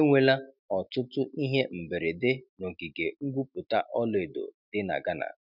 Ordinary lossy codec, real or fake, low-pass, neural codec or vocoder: none; real; 5.4 kHz; none